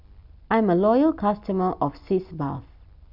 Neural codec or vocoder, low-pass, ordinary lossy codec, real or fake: none; 5.4 kHz; none; real